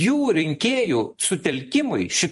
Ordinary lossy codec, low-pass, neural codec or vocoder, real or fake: MP3, 48 kbps; 14.4 kHz; vocoder, 44.1 kHz, 128 mel bands every 256 samples, BigVGAN v2; fake